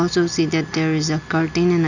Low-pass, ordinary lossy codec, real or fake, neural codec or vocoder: 7.2 kHz; none; real; none